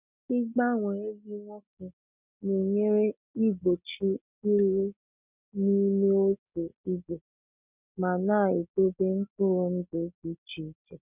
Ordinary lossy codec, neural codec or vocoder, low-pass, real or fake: none; none; 3.6 kHz; real